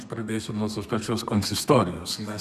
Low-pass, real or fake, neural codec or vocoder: 14.4 kHz; fake; codec, 32 kHz, 1.9 kbps, SNAC